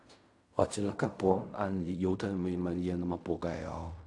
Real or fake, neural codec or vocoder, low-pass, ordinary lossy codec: fake; codec, 16 kHz in and 24 kHz out, 0.4 kbps, LongCat-Audio-Codec, fine tuned four codebook decoder; 10.8 kHz; AAC, 64 kbps